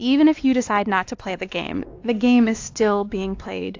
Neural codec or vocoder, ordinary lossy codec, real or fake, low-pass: codec, 16 kHz, 2 kbps, X-Codec, WavLM features, trained on Multilingual LibriSpeech; AAC, 48 kbps; fake; 7.2 kHz